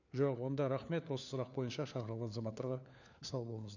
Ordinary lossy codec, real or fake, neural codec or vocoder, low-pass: none; fake; codec, 16 kHz, 4 kbps, FunCodec, trained on LibriTTS, 50 frames a second; 7.2 kHz